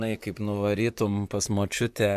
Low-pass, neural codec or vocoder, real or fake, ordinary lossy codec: 14.4 kHz; vocoder, 44.1 kHz, 128 mel bands, Pupu-Vocoder; fake; AAC, 96 kbps